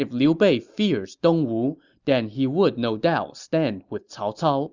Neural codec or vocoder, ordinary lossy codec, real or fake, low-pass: none; Opus, 64 kbps; real; 7.2 kHz